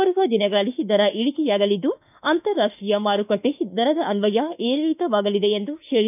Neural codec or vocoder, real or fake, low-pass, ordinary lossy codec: autoencoder, 48 kHz, 32 numbers a frame, DAC-VAE, trained on Japanese speech; fake; 3.6 kHz; none